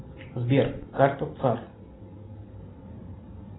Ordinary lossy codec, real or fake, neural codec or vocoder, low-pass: AAC, 16 kbps; real; none; 7.2 kHz